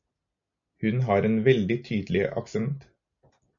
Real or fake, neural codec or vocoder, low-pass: real; none; 7.2 kHz